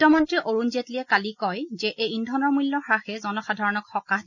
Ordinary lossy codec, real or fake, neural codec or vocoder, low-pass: none; real; none; 7.2 kHz